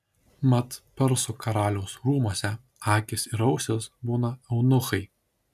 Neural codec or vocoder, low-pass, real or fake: none; 14.4 kHz; real